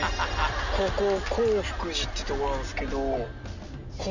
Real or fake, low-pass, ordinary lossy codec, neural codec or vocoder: real; 7.2 kHz; none; none